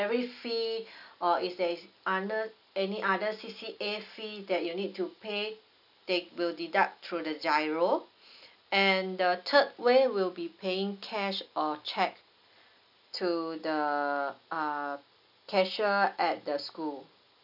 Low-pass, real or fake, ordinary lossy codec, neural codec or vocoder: 5.4 kHz; real; none; none